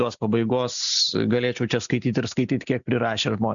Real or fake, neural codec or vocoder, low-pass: real; none; 7.2 kHz